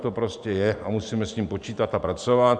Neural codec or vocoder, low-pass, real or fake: vocoder, 44.1 kHz, 128 mel bands every 512 samples, BigVGAN v2; 9.9 kHz; fake